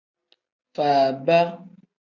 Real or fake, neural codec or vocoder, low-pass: real; none; 7.2 kHz